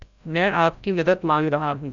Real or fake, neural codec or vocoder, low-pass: fake; codec, 16 kHz, 0.5 kbps, FreqCodec, larger model; 7.2 kHz